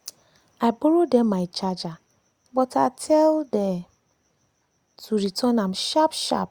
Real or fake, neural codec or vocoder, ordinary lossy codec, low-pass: real; none; Opus, 64 kbps; 19.8 kHz